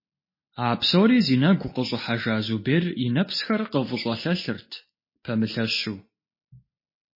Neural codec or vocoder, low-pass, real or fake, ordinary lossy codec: none; 5.4 kHz; real; MP3, 24 kbps